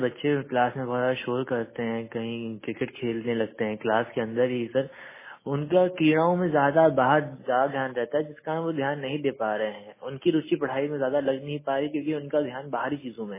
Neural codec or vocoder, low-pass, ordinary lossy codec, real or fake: none; 3.6 kHz; MP3, 16 kbps; real